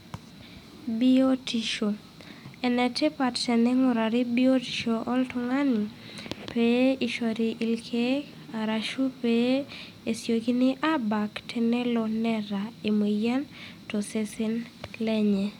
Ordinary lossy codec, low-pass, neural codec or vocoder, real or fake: none; 19.8 kHz; none; real